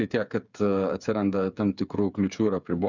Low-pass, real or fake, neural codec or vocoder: 7.2 kHz; fake; codec, 16 kHz, 8 kbps, FreqCodec, smaller model